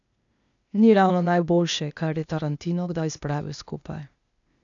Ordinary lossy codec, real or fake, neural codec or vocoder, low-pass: none; fake; codec, 16 kHz, 0.8 kbps, ZipCodec; 7.2 kHz